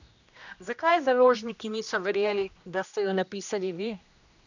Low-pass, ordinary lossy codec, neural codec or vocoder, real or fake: 7.2 kHz; none; codec, 16 kHz, 1 kbps, X-Codec, HuBERT features, trained on general audio; fake